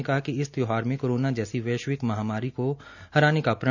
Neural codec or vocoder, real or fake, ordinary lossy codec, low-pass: none; real; none; 7.2 kHz